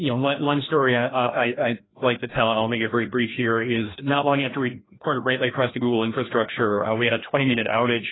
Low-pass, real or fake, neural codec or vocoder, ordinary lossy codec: 7.2 kHz; fake; codec, 16 kHz, 1 kbps, FreqCodec, larger model; AAC, 16 kbps